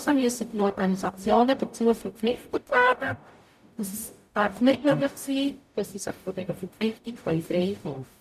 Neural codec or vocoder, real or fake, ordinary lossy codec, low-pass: codec, 44.1 kHz, 0.9 kbps, DAC; fake; none; 14.4 kHz